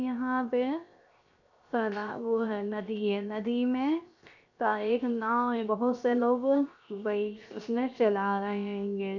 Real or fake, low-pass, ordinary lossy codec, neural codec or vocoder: fake; 7.2 kHz; none; codec, 16 kHz, 0.7 kbps, FocalCodec